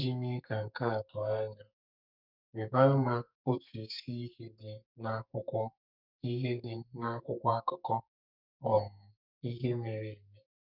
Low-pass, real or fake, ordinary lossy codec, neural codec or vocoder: 5.4 kHz; fake; none; codec, 44.1 kHz, 2.6 kbps, SNAC